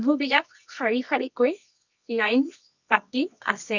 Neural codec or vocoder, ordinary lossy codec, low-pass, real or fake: codec, 24 kHz, 0.9 kbps, WavTokenizer, medium music audio release; none; 7.2 kHz; fake